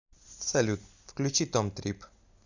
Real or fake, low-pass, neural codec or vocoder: real; 7.2 kHz; none